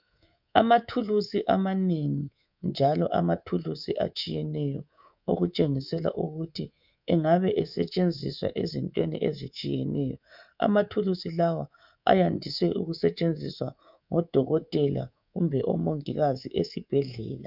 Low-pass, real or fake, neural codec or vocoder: 5.4 kHz; fake; codec, 24 kHz, 3.1 kbps, DualCodec